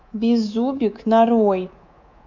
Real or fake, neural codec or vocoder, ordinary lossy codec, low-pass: fake; codec, 24 kHz, 3.1 kbps, DualCodec; none; 7.2 kHz